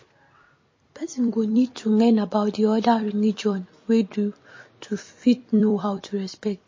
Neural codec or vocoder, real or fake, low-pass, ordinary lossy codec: vocoder, 44.1 kHz, 128 mel bands every 256 samples, BigVGAN v2; fake; 7.2 kHz; MP3, 32 kbps